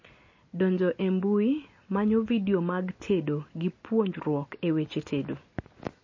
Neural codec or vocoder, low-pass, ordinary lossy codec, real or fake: none; 7.2 kHz; MP3, 32 kbps; real